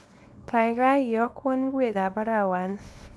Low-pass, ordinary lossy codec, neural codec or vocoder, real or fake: none; none; codec, 24 kHz, 0.9 kbps, WavTokenizer, small release; fake